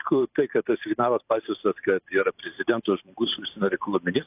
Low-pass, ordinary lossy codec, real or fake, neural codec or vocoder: 3.6 kHz; AAC, 32 kbps; fake; vocoder, 44.1 kHz, 128 mel bands every 512 samples, BigVGAN v2